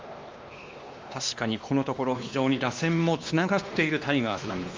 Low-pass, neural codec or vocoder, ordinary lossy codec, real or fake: 7.2 kHz; codec, 16 kHz, 2 kbps, X-Codec, WavLM features, trained on Multilingual LibriSpeech; Opus, 32 kbps; fake